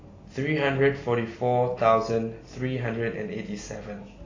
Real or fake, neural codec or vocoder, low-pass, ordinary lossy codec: real; none; 7.2 kHz; AAC, 32 kbps